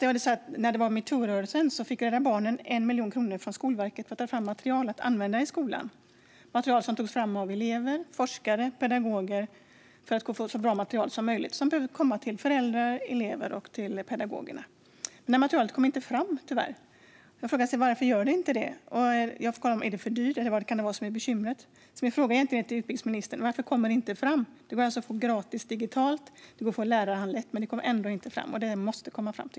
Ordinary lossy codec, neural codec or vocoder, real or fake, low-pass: none; none; real; none